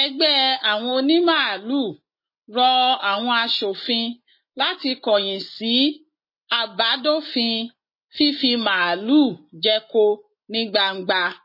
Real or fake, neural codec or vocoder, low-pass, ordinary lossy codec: real; none; 5.4 kHz; MP3, 24 kbps